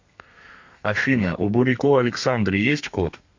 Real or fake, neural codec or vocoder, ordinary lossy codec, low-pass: fake; codec, 32 kHz, 1.9 kbps, SNAC; MP3, 48 kbps; 7.2 kHz